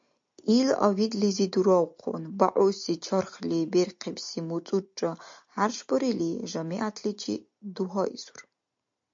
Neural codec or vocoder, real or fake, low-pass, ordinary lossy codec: none; real; 7.2 kHz; MP3, 48 kbps